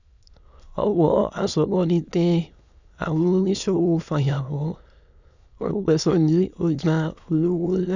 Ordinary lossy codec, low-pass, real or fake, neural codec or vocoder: none; 7.2 kHz; fake; autoencoder, 22.05 kHz, a latent of 192 numbers a frame, VITS, trained on many speakers